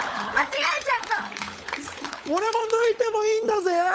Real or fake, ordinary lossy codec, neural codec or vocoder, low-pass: fake; none; codec, 16 kHz, 16 kbps, FunCodec, trained on LibriTTS, 50 frames a second; none